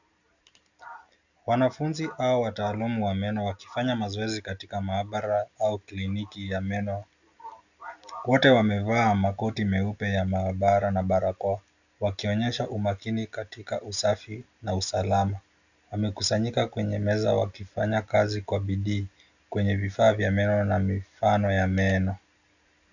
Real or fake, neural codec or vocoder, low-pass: real; none; 7.2 kHz